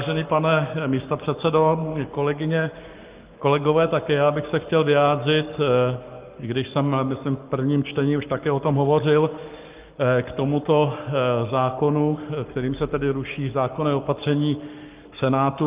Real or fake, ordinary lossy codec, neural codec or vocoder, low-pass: fake; Opus, 32 kbps; codec, 44.1 kHz, 7.8 kbps, Pupu-Codec; 3.6 kHz